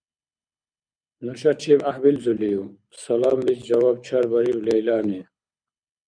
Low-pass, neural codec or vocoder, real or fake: 9.9 kHz; codec, 24 kHz, 6 kbps, HILCodec; fake